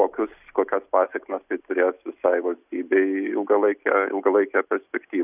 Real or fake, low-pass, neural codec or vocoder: real; 3.6 kHz; none